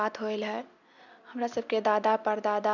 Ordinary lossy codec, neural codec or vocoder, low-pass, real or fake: none; none; 7.2 kHz; real